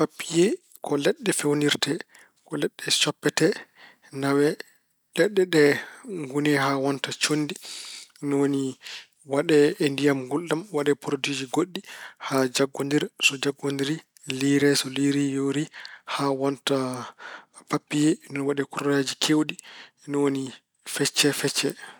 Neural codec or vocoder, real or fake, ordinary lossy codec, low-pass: none; real; none; none